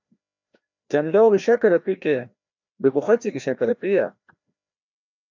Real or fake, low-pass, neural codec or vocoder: fake; 7.2 kHz; codec, 16 kHz, 1 kbps, FreqCodec, larger model